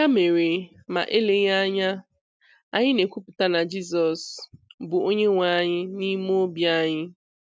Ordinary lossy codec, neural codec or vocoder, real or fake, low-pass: none; none; real; none